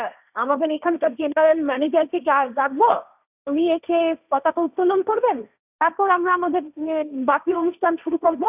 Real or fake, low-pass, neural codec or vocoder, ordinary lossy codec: fake; 3.6 kHz; codec, 16 kHz, 1.1 kbps, Voila-Tokenizer; none